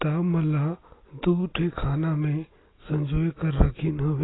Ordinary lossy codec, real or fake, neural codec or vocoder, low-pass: AAC, 16 kbps; real; none; 7.2 kHz